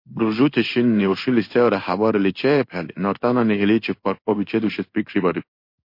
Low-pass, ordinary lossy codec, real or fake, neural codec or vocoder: 5.4 kHz; MP3, 32 kbps; fake; codec, 16 kHz in and 24 kHz out, 1 kbps, XY-Tokenizer